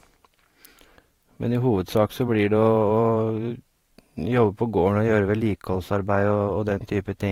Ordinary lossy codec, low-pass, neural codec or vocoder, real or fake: AAC, 48 kbps; 19.8 kHz; vocoder, 44.1 kHz, 128 mel bands every 512 samples, BigVGAN v2; fake